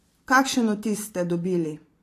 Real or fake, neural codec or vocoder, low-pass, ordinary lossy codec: fake; vocoder, 44.1 kHz, 128 mel bands every 512 samples, BigVGAN v2; 14.4 kHz; AAC, 48 kbps